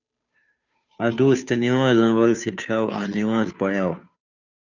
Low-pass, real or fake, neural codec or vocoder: 7.2 kHz; fake; codec, 16 kHz, 2 kbps, FunCodec, trained on Chinese and English, 25 frames a second